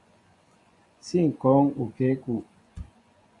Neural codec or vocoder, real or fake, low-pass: vocoder, 24 kHz, 100 mel bands, Vocos; fake; 10.8 kHz